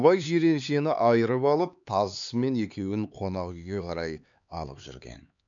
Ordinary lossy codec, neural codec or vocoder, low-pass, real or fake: none; codec, 16 kHz, 4 kbps, X-Codec, HuBERT features, trained on LibriSpeech; 7.2 kHz; fake